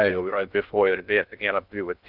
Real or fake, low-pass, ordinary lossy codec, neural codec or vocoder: fake; 5.4 kHz; Opus, 24 kbps; codec, 16 kHz in and 24 kHz out, 0.6 kbps, FocalCodec, streaming, 2048 codes